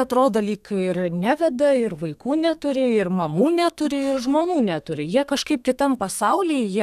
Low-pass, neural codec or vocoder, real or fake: 14.4 kHz; codec, 32 kHz, 1.9 kbps, SNAC; fake